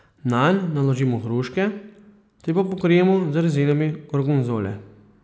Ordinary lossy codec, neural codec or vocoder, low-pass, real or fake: none; none; none; real